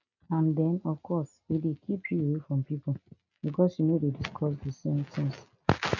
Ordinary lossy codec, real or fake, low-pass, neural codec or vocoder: none; real; 7.2 kHz; none